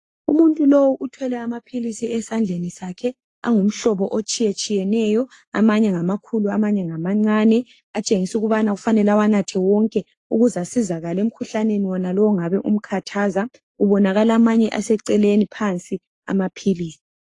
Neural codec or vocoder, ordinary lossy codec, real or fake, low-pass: codec, 44.1 kHz, 7.8 kbps, Pupu-Codec; AAC, 48 kbps; fake; 10.8 kHz